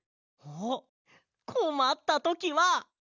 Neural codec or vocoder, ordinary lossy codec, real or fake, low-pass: none; none; real; 7.2 kHz